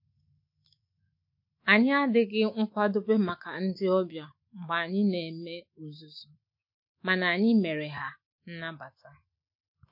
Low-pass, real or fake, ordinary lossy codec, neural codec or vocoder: 5.4 kHz; fake; MP3, 32 kbps; autoencoder, 48 kHz, 128 numbers a frame, DAC-VAE, trained on Japanese speech